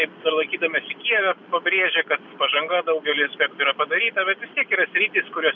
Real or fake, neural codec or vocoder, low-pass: real; none; 7.2 kHz